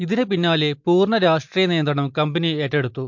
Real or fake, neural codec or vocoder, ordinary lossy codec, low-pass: real; none; MP3, 48 kbps; 7.2 kHz